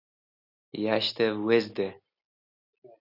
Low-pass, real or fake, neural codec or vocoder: 5.4 kHz; real; none